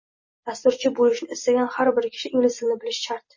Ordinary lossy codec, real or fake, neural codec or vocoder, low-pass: MP3, 32 kbps; real; none; 7.2 kHz